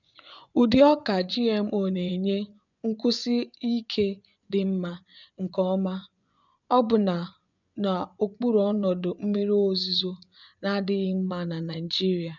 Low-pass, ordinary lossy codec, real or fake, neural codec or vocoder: 7.2 kHz; none; fake; vocoder, 24 kHz, 100 mel bands, Vocos